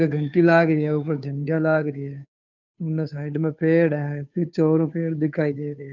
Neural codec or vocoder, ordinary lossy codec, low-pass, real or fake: codec, 16 kHz, 2 kbps, FunCodec, trained on Chinese and English, 25 frames a second; none; 7.2 kHz; fake